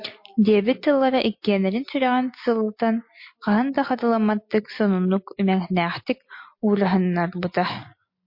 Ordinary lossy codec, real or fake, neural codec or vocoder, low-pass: MP3, 32 kbps; real; none; 5.4 kHz